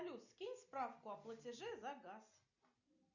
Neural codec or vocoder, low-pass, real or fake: none; 7.2 kHz; real